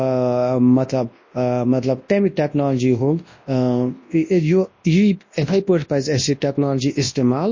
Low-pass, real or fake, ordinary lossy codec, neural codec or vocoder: 7.2 kHz; fake; MP3, 32 kbps; codec, 24 kHz, 0.9 kbps, WavTokenizer, large speech release